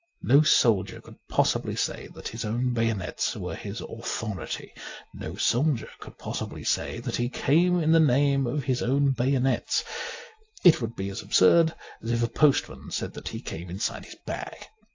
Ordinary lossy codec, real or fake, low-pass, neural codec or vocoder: AAC, 48 kbps; real; 7.2 kHz; none